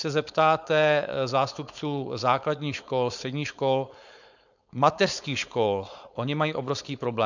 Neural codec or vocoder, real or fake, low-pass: codec, 16 kHz, 4.8 kbps, FACodec; fake; 7.2 kHz